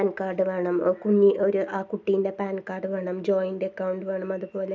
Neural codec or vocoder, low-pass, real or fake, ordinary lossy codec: none; none; real; none